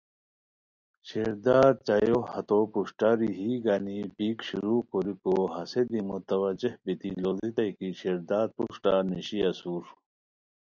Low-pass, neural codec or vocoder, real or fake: 7.2 kHz; none; real